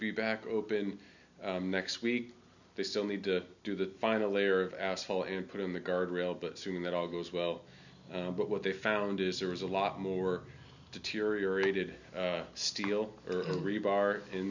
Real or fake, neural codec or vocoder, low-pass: real; none; 7.2 kHz